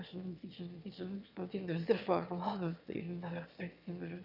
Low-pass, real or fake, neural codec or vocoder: 5.4 kHz; fake; autoencoder, 22.05 kHz, a latent of 192 numbers a frame, VITS, trained on one speaker